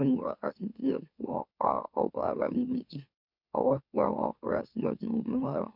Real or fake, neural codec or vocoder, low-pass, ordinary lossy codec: fake; autoencoder, 44.1 kHz, a latent of 192 numbers a frame, MeloTTS; 5.4 kHz; none